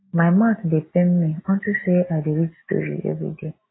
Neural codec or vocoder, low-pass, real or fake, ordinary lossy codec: none; 7.2 kHz; real; AAC, 16 kbps